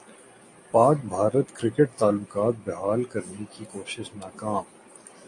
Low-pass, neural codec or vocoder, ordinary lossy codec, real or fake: 10.8 kHz; none; AAC, 48 kbps; real